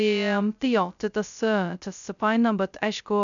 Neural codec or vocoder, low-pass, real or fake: codec, 16 kHz, 0.2 kbps, FocalCodec; 7.2 kHz; fake